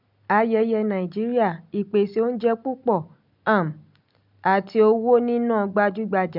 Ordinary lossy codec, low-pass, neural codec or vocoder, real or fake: none; 5.4 kHz; none; real